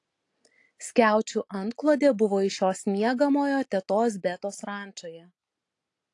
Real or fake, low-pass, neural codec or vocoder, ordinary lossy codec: real; 10.8 kHz; none; AAC, 48 kbps